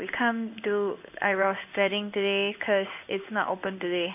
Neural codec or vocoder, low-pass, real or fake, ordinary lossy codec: codec, 16 kHz in and 24 kHz out, 1 kbps, XY-Tokenizer; 3.6 kHz; fake; none